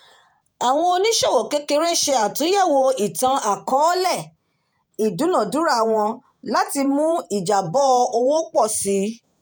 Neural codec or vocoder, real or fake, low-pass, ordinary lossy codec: vocoder, 48 kHz, 128 mel bands, Vocos; fake; none; none